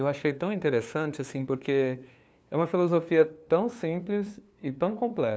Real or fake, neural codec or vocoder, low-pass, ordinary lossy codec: fake; codec, 16 kHz, 2 kbps, FunCodec, trained on LibriTTS, 25 frames a second; none; none